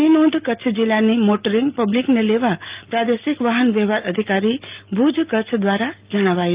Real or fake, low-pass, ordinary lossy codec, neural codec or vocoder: real; 3.6 kHz; Opus, 24 kbps; none